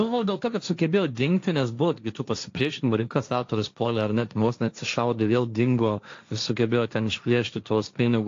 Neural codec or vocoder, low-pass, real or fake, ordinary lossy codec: codec, 16 kHz, 1.1 kbps, Voila-Tokenizer; 7.2 kHz; fake; AAC, 48 kbps